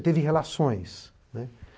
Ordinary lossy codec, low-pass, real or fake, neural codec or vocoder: none; none; real; none